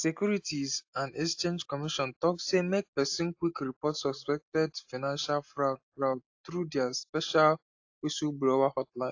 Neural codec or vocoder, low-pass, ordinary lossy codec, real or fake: none; 7.2 kHz; AAC, 48 kbps; real